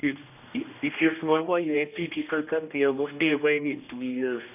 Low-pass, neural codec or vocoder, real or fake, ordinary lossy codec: 3.6 kHz; codec, 16 kHz, 1 kbps, X-Codec, HuBERT features, trained on general audio; fake; none